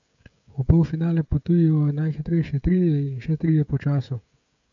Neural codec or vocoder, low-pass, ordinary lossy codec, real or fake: codec, 16 kHz, 16 kbps, FreqCodec, smaller model; 7.2 kHz; none; fake